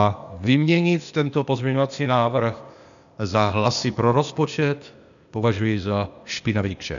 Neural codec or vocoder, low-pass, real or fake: codec, 16 kHz, 0.8 kbps, ZipCodec; 7.2 kHz; fake